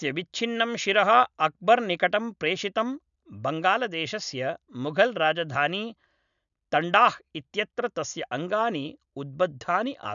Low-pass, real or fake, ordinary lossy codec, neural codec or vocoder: 7.2 kHz; real; none; none